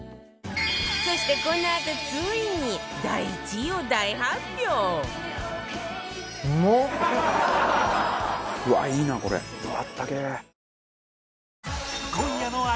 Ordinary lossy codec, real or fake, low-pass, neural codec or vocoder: none; real; none; none